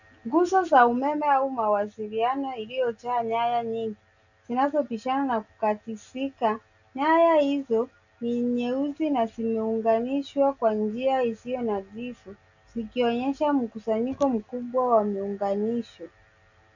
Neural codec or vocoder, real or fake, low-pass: none; real; 7.2 kHz